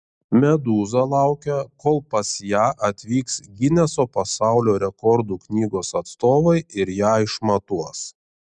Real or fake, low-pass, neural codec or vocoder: real; 9.9 kHz; none